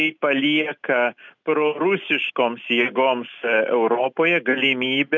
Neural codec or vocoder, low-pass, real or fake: none; 7.2 kHz; real